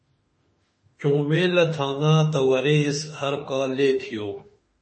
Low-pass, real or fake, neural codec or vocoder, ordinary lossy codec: 9.9 kHz; fake; autoencoder, 48 kHz, 32 numbers a frame, DAC-VAE, trained on Japanese speech; MP3, 32 kbps